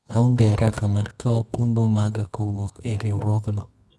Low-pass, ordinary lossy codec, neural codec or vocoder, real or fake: none; none; codec, 24 kHz, 0.9 kbps, WavTokenizer, medium music audio release; fake